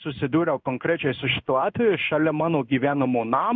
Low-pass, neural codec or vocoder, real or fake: 7.2 kHz; codec, 16 kHz in and 24 kHz out, 1 kbps, XY-Tokenizer; fake